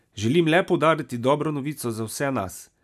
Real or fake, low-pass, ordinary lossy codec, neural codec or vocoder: real; 14.4 kHz; none; none